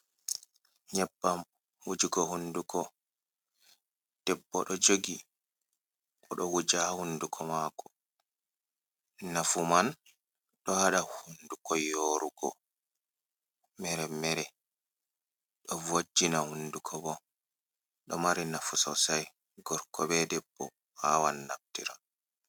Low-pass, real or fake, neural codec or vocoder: 19.8 kHz; real; none